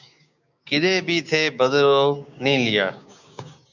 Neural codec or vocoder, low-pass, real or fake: codec, 16 kHz, 6 kbps, DAC; 7.2 kHz; fake